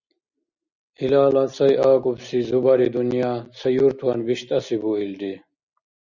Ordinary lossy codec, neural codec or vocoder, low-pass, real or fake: Opus, 64 kbps; none; 7.2 kHz; real